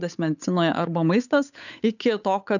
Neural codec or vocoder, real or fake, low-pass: codec, 16 kHz, 8 kbps, FunCodec, trained on Chinese and English, 25 frames a second; fake; 7.2 kHz